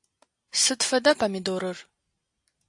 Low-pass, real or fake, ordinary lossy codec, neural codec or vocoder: 10.8 kHz; real; AAC, 64 kbps; none